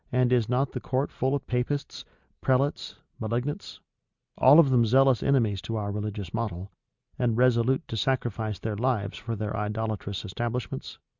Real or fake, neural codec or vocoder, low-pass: real; none; 7.2 kHz